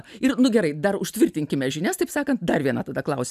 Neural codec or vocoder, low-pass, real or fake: none; 14.4 kHz; real